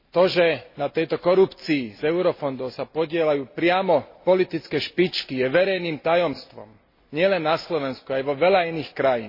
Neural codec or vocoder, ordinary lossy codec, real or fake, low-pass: none; MP3, 24 kbps; real; 5.4 kHz